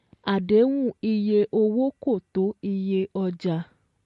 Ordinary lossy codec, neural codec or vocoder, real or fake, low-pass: MP3, 48 kbps; none; real; 14.4 kHz